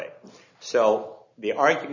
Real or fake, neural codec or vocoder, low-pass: real; none; 7.2 kHz